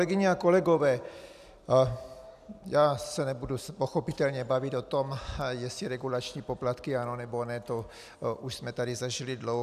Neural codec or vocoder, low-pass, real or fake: none; 14.4 kHz; real